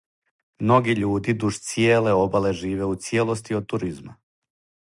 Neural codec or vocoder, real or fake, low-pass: none; real; 10.8 kHz